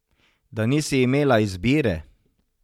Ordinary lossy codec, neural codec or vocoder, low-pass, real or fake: MP3, 96 kbps; none; 19.8 kHz; real